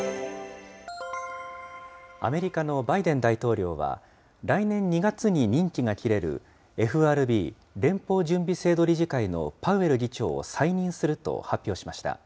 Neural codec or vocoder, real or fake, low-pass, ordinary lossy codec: none; real; none; none